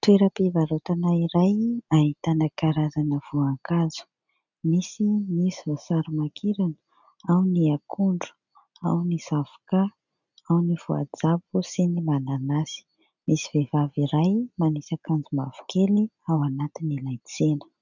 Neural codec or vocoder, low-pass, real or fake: none; 7.2 kHz; real